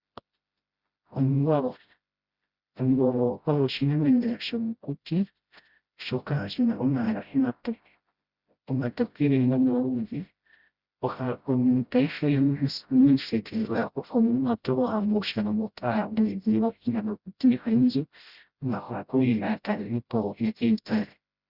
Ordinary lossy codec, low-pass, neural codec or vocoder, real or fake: Opus, 64 kbps; 5.4 kHz; codec, 16 kHz, 0.5 kbps, FreqCodec, smaller model; fake